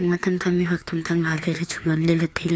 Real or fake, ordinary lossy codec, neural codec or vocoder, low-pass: fake; none; codec, 16 kHz, 2 kbps, FreqCodec, larger model; none